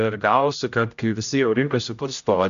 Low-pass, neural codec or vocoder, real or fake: 7.2 kHz; codec, 16 kHz, 0.5 kbps, X-Codec, HuBERT features, trained on general audio; fake